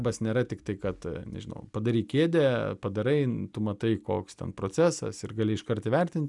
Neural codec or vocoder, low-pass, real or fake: none; 10.8 kHz; real